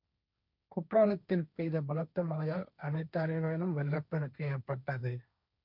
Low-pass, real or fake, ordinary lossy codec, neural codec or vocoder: 5.4 kHz; fake; none; codec, 16 kHz, 1.1 kbps, Voila-Tokenizer